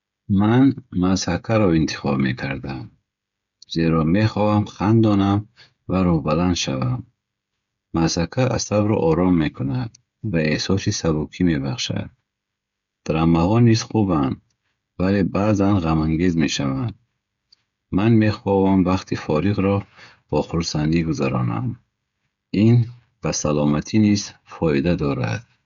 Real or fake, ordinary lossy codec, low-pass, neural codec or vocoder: fake; none; 7.2 kHz; codec, 16 kHz, 16 kbps, FreqCodec, smaller model